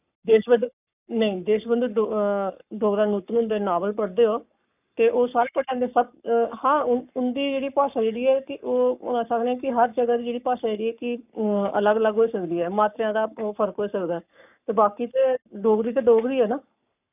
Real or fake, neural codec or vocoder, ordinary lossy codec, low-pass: fake; codec, 44.1 kHz, 7.8 kbps, Pupu-Codec; none; 3.6 kHz